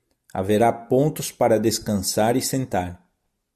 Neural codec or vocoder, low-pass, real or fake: none; 14.4 kHz; real